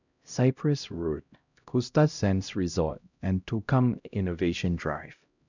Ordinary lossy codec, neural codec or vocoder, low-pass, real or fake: none; codec, 16 kHz, 0.5 kbps, X-Codec, HuBERT features, trained on LibriSpeech; 7.2 kHz; fake